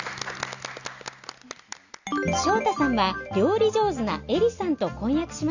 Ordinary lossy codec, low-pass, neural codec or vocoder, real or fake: none; 7.2 kHz; none; real